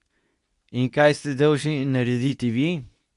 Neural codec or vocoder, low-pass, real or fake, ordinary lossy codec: codec, 24 kHz, 0.9 kbps, WavTokenizer, medium speech release version 2; 10.8 kHz; fake; none